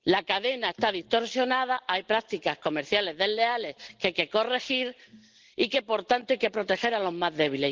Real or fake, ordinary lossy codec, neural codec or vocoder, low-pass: real; Opus, 16 kbps; none; 7.2 kHz